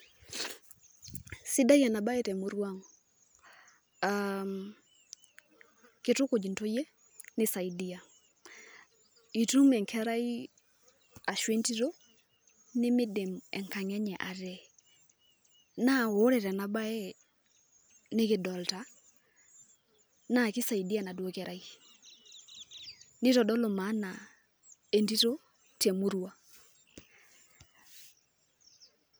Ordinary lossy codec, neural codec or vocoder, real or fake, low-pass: none; none; real; none